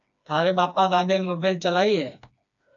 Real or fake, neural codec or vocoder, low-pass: fake; codec, 16 kHz, 2 kbps, FreqCodec, smaller model; 7.2 kHz